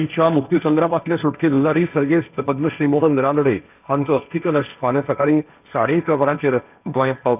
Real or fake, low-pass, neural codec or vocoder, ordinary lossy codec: fake; 3.6 kHz; codec, 16 kHz, 1.1 kbps, Voila-Tokenizer; none